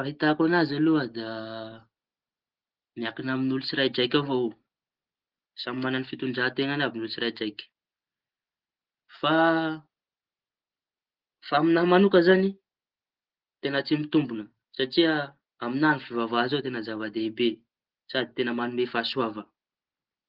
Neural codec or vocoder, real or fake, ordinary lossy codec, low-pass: none; real; Opus, 16 kbps; 5.4 kHz